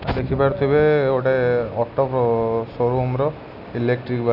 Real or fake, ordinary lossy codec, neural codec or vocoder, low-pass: real; none; none; 5.4 kHz